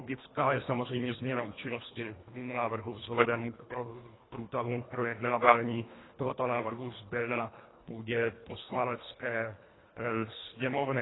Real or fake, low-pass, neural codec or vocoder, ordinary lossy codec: fake; 7.2 kHz; codec, 24 kHz, 1.5 kbps, HILCodec; AAC, 16 kbps